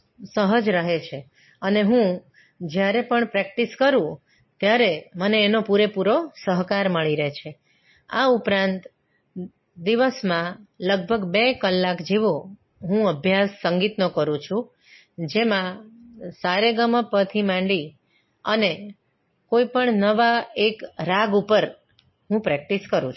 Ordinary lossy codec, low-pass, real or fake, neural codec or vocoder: MP3, 24 kbps; 7.2 kHz; real; none